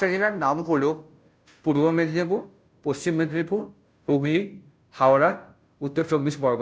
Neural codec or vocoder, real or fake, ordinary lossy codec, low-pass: codec, 16 kHz, 0.5 kbps, FunCodec, trained on Chinese and English, 25 frames a second; fake; none; none